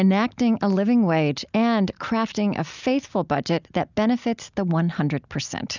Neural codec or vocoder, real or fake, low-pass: none; real; 7.2 kHz